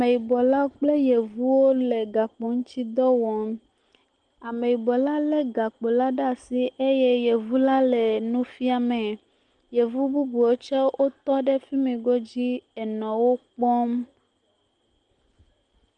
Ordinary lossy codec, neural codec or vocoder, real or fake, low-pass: Opus, 24 kbps; none; real; 9.9 kHz